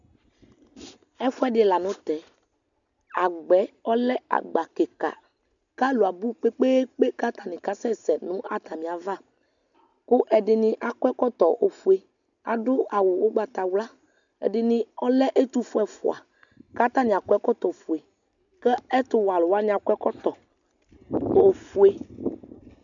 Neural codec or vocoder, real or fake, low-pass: none; real; 7.2 kHz